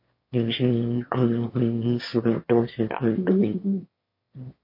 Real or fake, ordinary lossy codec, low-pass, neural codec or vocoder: fake; MP3, 32 kbps; 5.4 kHz; autoencoder, 22.05 kHz, a latent of 192 numbers a frame, VITS, trained on one speaker